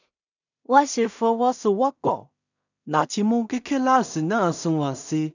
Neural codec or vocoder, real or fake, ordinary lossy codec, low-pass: codec, 16 kHz in and 24 kHz out, 0.4 kbps, LongCat-Audio-Codec, two codebook decoder; fake; none; 7.2 kHz